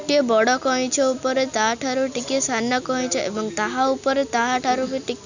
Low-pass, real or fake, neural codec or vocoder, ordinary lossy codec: 7.2 kHz; real; none; none